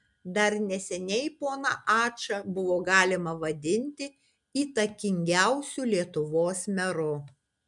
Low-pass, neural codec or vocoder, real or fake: 10.8 kHz; none; real